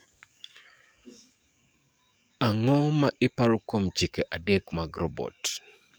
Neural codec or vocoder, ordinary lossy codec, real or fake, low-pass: codec, 44.1 kHz, 7.8 kbps, DAC; none; fake; none